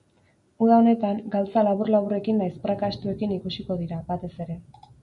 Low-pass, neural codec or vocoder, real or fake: 10.8 kHz; none; real